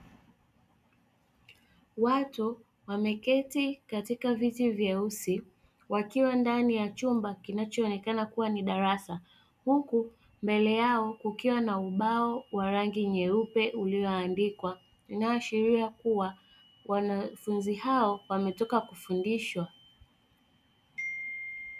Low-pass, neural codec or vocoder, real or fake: 14.4 kHz; none; real